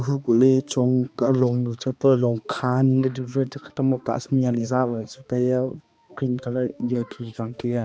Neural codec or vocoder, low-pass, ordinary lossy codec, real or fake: codec, 16 kHz, 2 kbps, X-Codec, HuBERT features, trained on balanced general audio; none; none; fake